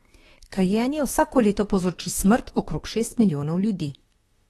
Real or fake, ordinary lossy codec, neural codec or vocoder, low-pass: fake; AAC, 32 kbps; autoencoder, 48 kHz, 32 numbers a frame, DAC-VAE, trained on Japanese speech; 19.8 kHz